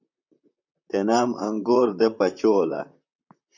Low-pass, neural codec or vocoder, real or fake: 7.2 kHz; vocoder, 44.1 kHz, 128 mel bands, Pupu-Vocoder; fake